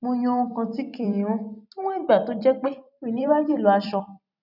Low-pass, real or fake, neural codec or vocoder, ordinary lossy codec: 5.4 kHz; fake; vocoder, 44.1 kHz, 128 mel bands every 256 samples, BigVGAN v2; none